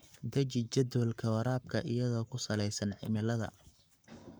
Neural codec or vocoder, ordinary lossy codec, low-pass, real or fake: codec, 44.1 kHz, 7.8 kbps, Pupu-Codec; none; none; fake